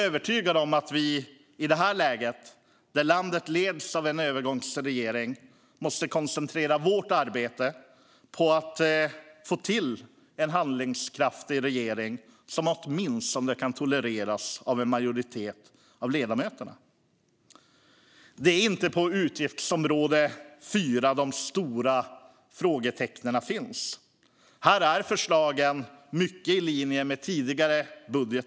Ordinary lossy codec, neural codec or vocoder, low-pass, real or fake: none; none; none; real